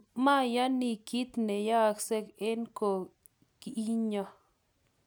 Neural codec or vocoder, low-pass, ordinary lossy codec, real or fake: none; none; none; real